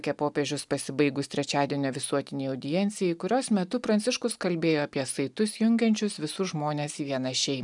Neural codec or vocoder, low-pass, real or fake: none; 10.8 kHz; real